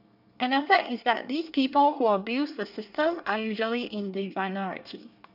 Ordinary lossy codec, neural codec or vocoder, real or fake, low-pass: none; codec, 24 kHz, 1 kbps, SNAC; fake; 5.4 kHz